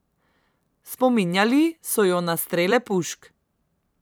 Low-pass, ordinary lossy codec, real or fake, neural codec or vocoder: none; none; fake; vocoder, 44.1 kHz, 128 mel bands every 256 samples, BigVGAN v2